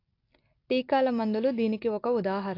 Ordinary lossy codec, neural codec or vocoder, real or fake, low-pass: AAC, 32 kbps; none; real; 5.4 kHz